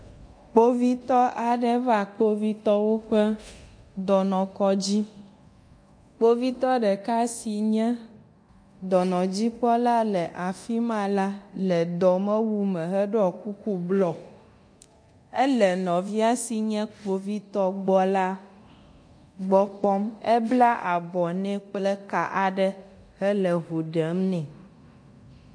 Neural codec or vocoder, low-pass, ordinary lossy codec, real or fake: codec, 24 kHz, 0.9 kbps, DualCodec; 9.9 kHz; MP3, 48 kbps; fake